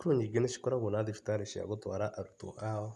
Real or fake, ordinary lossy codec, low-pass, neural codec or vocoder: real; none; none; none